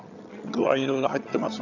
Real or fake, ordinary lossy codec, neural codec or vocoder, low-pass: fake; none; vocoder, 22.05 kHz, 80 mel bands, HiFi-GAN; 7.2 kHz